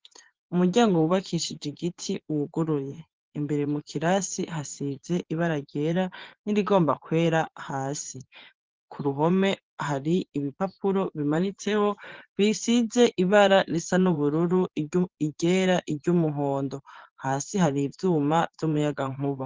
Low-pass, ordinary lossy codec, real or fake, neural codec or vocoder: 7.2 kHz; Opus, 16 kbps; fake; vocoder, 24 kHz, 100 mel bands, Vocos